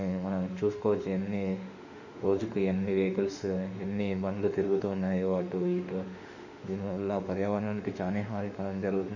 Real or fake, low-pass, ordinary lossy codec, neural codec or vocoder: fake; 7.2 kHz; none; autoencoder, 48 kHz, 32 numbers a frame, DAC-VAE, trained on Japanese speech